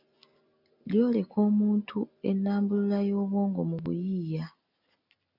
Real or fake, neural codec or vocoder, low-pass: real; none; 5.4 kHz